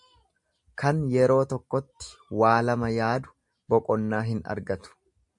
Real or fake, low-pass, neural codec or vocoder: real; 10.8 kHz; none